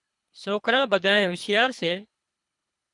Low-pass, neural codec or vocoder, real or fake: 10.8 kHz; codec, 24 kHz, 3 kbps, HILCodec; fake